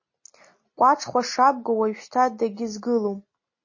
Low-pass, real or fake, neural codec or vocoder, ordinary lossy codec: 7.2 kHz; real; none; MP3, 32 kbps